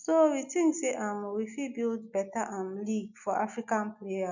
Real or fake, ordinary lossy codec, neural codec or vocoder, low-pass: real; none; none; 7.2 kHz